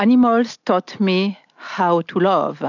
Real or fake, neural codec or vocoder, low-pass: real; none; 7.2 kHz